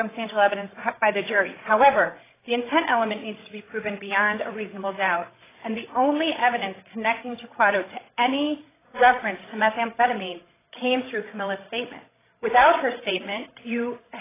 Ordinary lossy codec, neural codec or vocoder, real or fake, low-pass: AAC, 16 kbps; codec, 16 kHz, 16 kbps, FreqCodec, larger model; fake; 3.6 kHz